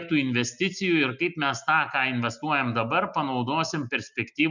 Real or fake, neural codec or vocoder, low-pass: real; none; 7.2 kHz